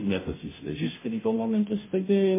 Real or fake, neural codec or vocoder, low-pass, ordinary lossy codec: fake; codec, 16 kHz, 0.5 kbps, FunCodec, trained on Chinese and English, 25 frames a second; 3.6 kHz; MP3, 16 kbps